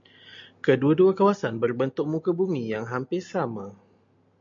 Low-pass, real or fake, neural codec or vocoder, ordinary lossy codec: 7.2 kHz; real; none; MP3, 48 kbps